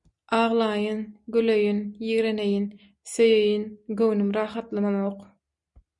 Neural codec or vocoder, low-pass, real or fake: none; 10.8 kHz; real